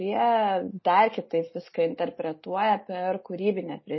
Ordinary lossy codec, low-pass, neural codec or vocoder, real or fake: MP3, 24 kbps; 7.2 kHz; none; real